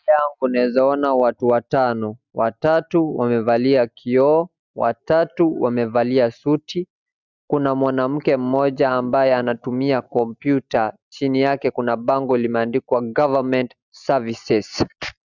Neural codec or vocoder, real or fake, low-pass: none; real; 7.2 kHz